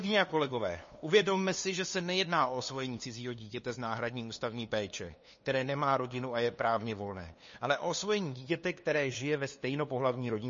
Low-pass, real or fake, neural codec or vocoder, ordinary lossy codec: 7.2 kHz; fake; codec, 16 kHz, 2 kbps, FunCodec, trained on LibriTTS, 25 frames a second; MP3, 32 kbps